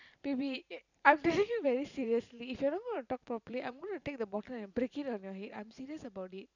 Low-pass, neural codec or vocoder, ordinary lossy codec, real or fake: 7.2 kHz; vocoder, 44.1 kHz, 128 mel bands every 512 samples, BigVGAN v2; none; fake